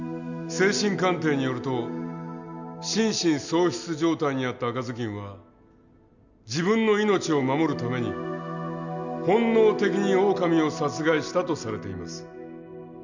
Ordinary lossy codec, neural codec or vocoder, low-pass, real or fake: none; none; 7.2 kHz; real